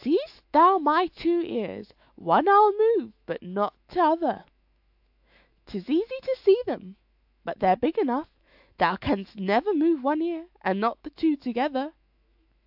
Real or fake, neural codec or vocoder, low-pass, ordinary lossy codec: fake; autoencoder, 48 kHz, 128 numbers a frame, DAC-VAE, trained on Japanese speech; 5.4 kHz; AAC, 48 kbps